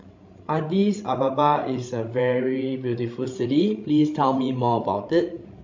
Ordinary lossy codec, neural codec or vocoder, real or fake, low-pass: MP3, 48 kbps; codec, 16 kHz, 16 kbps, FreqCodec, larger model; fake; 7.2 kHz